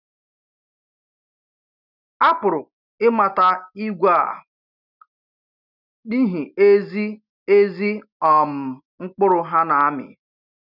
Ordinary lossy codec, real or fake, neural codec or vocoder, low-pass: none; real; none; 5.4 kHz